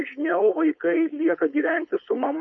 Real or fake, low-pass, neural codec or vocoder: fake; 7.2 kHz; codec, 16 kHz, 4.8 kbps, FACodec